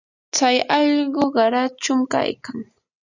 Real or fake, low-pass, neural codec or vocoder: real; 7.2 kHz; none